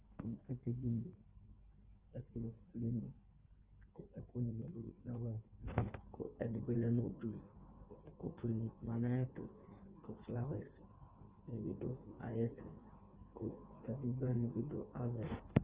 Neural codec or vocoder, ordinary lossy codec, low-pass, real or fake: codec, 16 kHz in and 24 kHz out, 1.1 kbps, FireRedTTS-2 codec; Opus, 16 kbps; 3.6 kHz; fake